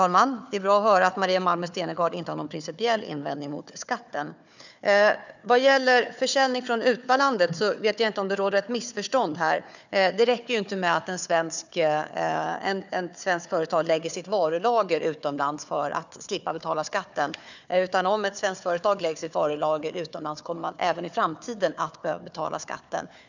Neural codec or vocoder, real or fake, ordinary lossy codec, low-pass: codec, 16 kHz, 4 kbps, FunCodec, trained on Chinese and English, 50 frames a second; fake; none; 7.2 kHz